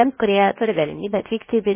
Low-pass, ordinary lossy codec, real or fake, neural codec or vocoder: 3.6 kHz; MP3, 16 kbps; fake; codec, 16 kHz, 0.7 kbps, FocalCodec